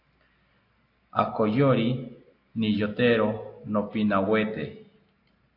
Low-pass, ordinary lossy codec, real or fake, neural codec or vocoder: 5.4 kHz; AAC, 32 kbps; real; none